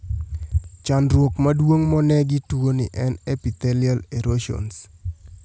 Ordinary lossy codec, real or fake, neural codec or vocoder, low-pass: none; real; none; none